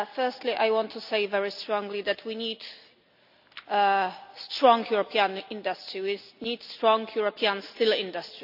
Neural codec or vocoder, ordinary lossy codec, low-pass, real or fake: none; none; 5.4 kHz; real